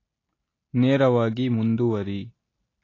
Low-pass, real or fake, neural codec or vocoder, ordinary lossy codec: 7.2 kHz; real; none; AAC, 32 kbps